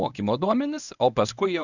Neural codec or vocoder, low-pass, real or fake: codec, 24 kHz, 0.9 kbps, WavTokenizer, medium speech release version 1; 7.2 kHz; fake